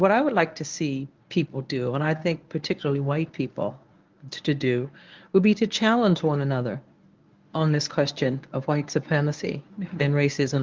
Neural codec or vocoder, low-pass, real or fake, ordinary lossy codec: codec, 24 kHz, 0.9 kbps, WavTokenizer, medium speech release version 1; 7.2 kHz; fake; Opus, 24 kbps